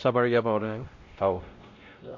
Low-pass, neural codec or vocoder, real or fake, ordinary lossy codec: 7.2 kHz; codec, 16 kHz, 0.5 kbps, X-Codec, WavLM features, trained on Multilingual LibriSpeech; fake; MP3, 48 kbps